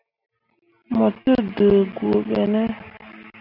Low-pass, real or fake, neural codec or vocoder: 5.4 kHz; real; none